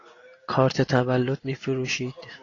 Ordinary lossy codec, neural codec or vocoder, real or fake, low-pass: AAC, 32 kbps; none; real; 7.2 kHz